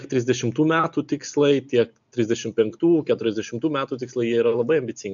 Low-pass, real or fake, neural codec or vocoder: 7.2 kHz; real; none